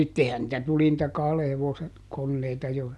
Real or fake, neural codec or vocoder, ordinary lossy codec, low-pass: real; none; none; none